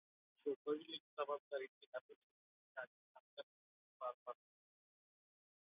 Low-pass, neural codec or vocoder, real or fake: 3.6 kHz; none; real